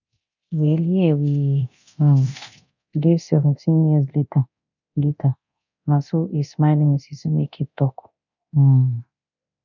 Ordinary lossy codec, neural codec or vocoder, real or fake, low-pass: none; codec, 24 kHz, 0.9 kbps, DualCodec; fake; 7.2 kHz